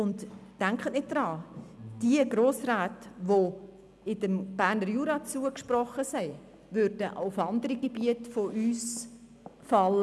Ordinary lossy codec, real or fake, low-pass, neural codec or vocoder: none; real; none; none